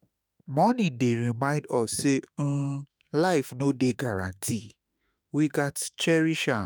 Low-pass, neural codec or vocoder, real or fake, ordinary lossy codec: none; autoencoder, 48 kHz, 32 numbers a frame, DAC-VAE, trained on Japanese speech; fake; none